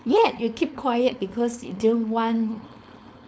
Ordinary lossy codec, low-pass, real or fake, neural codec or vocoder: none; none; fake; codec, 16 kHz, 4.8 kbps, FACodec